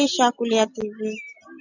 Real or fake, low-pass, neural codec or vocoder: real; 7.2 kHz; none